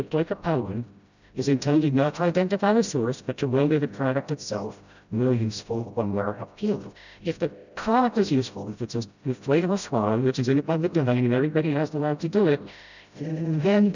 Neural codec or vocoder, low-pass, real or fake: codec, 16 kHz, 0.5 kbps, FreqCodec, smaller model; 7.2 kHz; fake